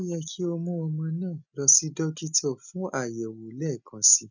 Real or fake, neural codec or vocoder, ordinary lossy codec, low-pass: real; none; none; 7.2 kHz